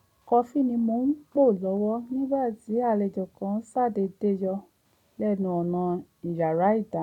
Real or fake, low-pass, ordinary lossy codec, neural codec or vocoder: real; 19.8 kHz; none; none